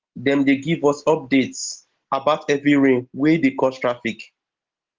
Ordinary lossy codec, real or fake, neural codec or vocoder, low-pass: Opus, 16 kbps; real; none; 7.2 kHz